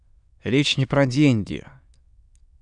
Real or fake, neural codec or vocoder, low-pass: fake; autoencoder, 22.05 kHz, a latent of 192 numbers a frame, VITS, trained on many speakers; 9.9 kHz